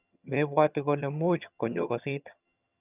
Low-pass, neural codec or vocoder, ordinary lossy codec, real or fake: 3.6 kHz; vocoder, 22.05 kHz, 80 mel bands, HiFi-GAN; none; fake